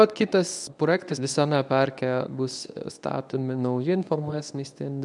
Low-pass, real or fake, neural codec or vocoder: 10.8 kHz; fake; codec, 24 kHz, 0.9 kbps, WavTokenizer, medium speech release version 1